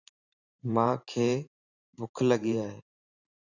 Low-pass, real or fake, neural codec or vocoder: 7.2 kHz; fake; vocoder, 44.1 kHz, 128 mel bands every 512 samples, BigVGAN v2